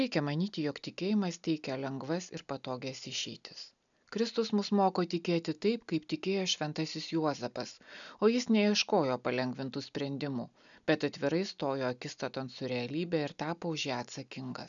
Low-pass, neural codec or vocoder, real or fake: 7.2 kHz; none; real